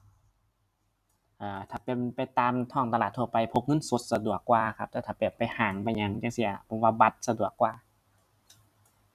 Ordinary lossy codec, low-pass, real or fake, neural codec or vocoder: none; 14.4 kHz; fake; vocoder, 44.1 kHz, 128 mel bands every 256 samples, BigVGAN v2